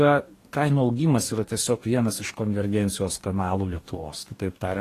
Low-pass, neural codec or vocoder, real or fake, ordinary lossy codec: 14.4 kHz; codec, 44.1 kHz, 2.6 kbps, DAC; fake; AAC, 48 kbps